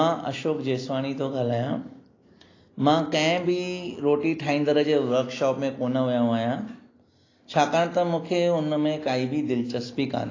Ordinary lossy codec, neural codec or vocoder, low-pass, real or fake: AAC, 32 kbps; none; 7.2 kHz; real